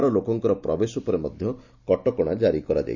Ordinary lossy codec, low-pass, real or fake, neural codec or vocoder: none; 7.2 kHz; real; none